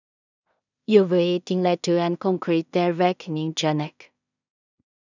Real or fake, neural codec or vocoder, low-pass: fake; codec, 16 kHz in and 24 kHz out, 0.4 kbps, LongCat-Audio-Codec, two codebook decoder; 7.2 kHz